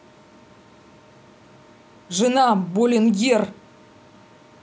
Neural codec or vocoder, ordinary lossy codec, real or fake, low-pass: none; none; real; none